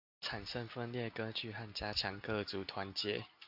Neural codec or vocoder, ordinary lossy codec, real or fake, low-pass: none; AAC, 48 kbps; real; 5.4 kHz